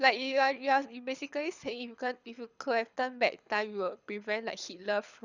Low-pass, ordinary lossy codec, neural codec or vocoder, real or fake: 7.2 kHz; Opus, 64 kbps; codec, 24 kHz, 6 kbps, HILCodec; fake